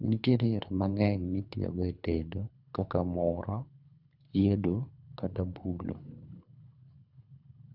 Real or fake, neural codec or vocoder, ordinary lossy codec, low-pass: fake; codec, 24 kHz, 3 kbps, HILCodec; none; 5.4 kHz